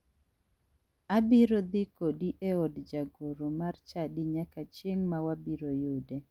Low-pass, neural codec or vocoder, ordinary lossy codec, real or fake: 19.8 kHz; none; Opus, 32 kbps; real